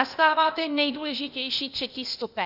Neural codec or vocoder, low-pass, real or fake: codec, 16 kHz, 0.8 kbps, ZipCodec; 5.4 kHz; fake